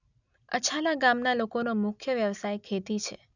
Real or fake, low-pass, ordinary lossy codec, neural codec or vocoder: real; 7.2 kHz; none; none